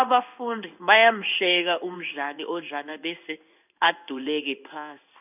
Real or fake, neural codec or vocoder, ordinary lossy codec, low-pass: fake; codec, 16 kHz in and 24 kHz out, 1 kbps, XY-Tokenizer; none; 3.6 kHz